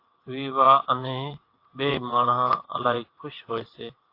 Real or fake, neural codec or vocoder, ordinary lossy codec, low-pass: fake; vocoder, 22.05 kHz, 80 mel bands, WaveNeXt; AAC, 32 kbps; 5.4 kHz